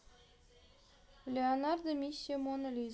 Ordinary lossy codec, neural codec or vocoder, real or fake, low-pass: none; none; real; none